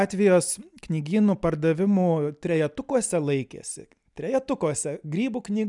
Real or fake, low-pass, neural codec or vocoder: real; 10.8 kHz; none